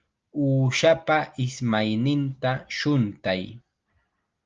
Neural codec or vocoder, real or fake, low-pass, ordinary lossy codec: none; real; 7.2 kHz; Opus, 32 kbps